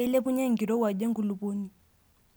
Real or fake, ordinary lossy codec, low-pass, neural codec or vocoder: real; none; none; none